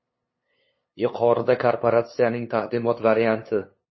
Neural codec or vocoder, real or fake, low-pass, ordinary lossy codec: codec, 16 kHz, 2 kbps, FunCodec, trained on LibriTTS, 25 frames a second; fake; 7.2 kHz; MP3, 24 kbps